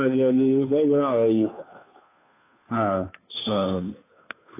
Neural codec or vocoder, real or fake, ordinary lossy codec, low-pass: codec, 16 kHz, 2 kbps, FunCodec, trained on Chinese and English, 25 frames a second; fake; AAC, 24 kbps; 3.6 kHz